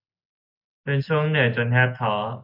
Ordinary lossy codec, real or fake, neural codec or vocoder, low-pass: MP3, 48 kbps; real; none; 5.4 kHz